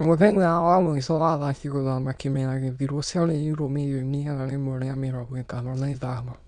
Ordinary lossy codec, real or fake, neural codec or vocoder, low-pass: none; fake; autoencoder, 22.05 kHz, a latent of 192 numbers a frame, VITS, trained on many speakers; 9.9 kHz